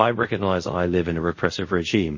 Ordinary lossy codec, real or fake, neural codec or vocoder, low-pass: MP3, 32 kbps; fake; codec, 24 kHz, 0.5 kbps, DualCodec; 7.2 kHz